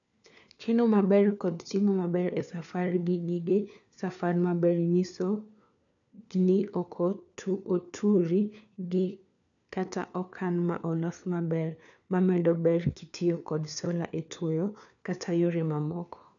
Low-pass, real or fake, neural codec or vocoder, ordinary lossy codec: 7.2 kHz; fake; codec, 16 kHz, 4 kbps, FunCodec, trained on LibriTTS, 50 frames a second; none